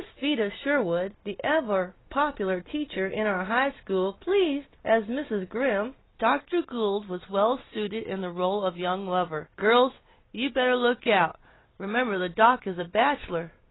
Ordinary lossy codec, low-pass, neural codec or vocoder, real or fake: AAC, 16 kbps; 7.2 kHz; none; real